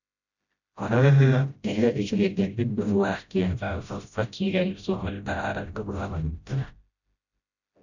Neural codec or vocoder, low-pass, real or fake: codec, 16 kHz, 0.5 kbps, FreqCodec, smaller model; 7.2 kHz; fake